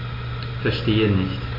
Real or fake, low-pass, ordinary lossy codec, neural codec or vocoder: real; 5.4 kHz; MP3, 48 kbps; none